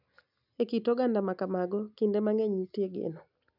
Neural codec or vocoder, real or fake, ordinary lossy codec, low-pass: none; real; none; 5.4 kHz